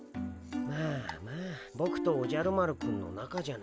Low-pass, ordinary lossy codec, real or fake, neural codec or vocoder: none; none; real; none